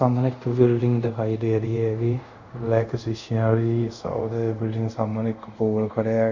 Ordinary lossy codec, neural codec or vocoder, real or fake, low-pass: Opus, 64 kbps; codec, 24 kHz, 0.5 kbps, DualCodec; fake; 7.2 kHz